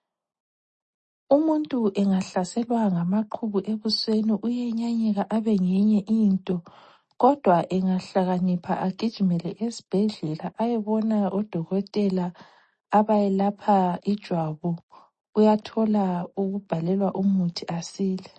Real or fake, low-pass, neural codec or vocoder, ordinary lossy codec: real; 10.8 kHz; none; MP3, 32 kbps